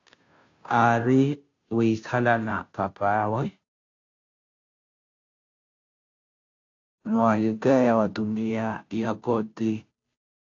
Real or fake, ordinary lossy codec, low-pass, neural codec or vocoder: fake; AAC, 48 kbps; 7.2 kHz; codec, 16 kHz, 0.5 kbps, FunCodec, trained on Chinese and English, 25 frames a second